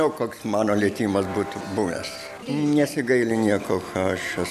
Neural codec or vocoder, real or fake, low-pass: none; real; 14.4 kHz